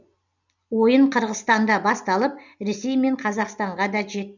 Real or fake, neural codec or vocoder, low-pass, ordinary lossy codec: real; none; 7.2 kHz; none